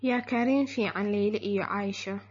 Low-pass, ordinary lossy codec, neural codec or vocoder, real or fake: 7.2 kHz; MP3, 32 kbps; none; real